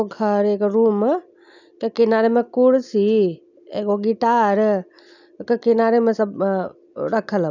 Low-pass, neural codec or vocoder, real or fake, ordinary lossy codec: 7.2 kHz; none; real; none